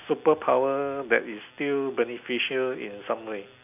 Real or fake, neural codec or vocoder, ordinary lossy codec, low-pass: real; none; none; 3.6 kHz